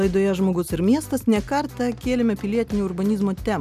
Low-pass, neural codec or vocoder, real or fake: 14.4 kHz; none; real